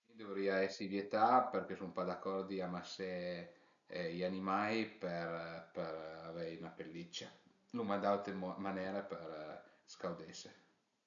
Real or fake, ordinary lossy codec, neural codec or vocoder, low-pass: real; none; none; 7.2 kHz